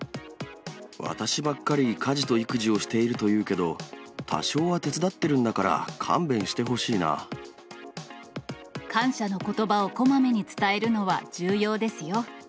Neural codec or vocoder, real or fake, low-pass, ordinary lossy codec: none; real; none; none